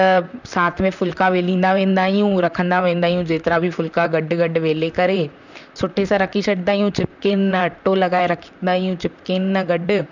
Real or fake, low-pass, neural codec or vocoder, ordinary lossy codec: fake; 7.2 kHz; vocoder, 44.1 kHz, 128 mel bands, Pupu-Vocoder; none